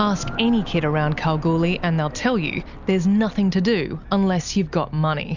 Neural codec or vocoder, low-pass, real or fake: none; 7.2 kHz; real